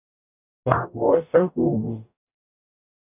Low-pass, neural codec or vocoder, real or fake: 3.6 kHz; codec, 44.1 kHz, 0.9 kbps, DAC; fake